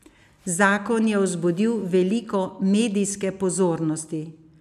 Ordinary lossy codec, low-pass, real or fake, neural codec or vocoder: none; 14.4 kHz; real; none